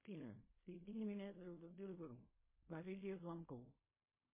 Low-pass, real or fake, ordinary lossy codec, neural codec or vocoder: 3.6 kHz; fake; MP3, 16 kbps; codec, 16 kHz in and 24 kHz out, 0.4 kbps, LongCat-Audio-Codec, fine tuned four codebook decoder